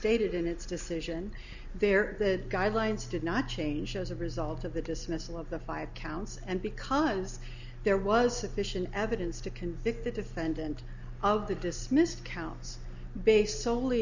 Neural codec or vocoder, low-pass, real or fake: none; 7.2 kHz; real